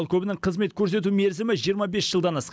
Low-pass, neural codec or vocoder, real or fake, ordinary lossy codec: none; none; real; none